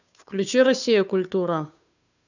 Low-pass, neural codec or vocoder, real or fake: 7.2 kHz; codec, 16 kHz, 2 kbps, FunCodec, trained on Chinese and English, 25 frames a second; fake